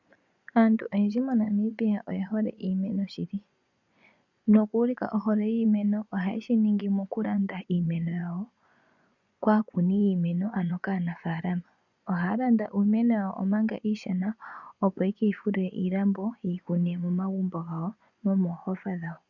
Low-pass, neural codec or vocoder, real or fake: 7.2 kHz; vocoder, 24 kHz, 100 mel bands, Vocos; fake